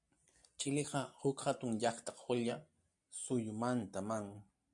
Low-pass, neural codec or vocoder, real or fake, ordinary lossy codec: 10.8 kHz; none; real; MP3, 96 kbps